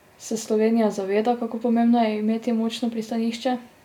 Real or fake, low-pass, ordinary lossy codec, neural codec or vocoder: real; 19.8 kHz; none; none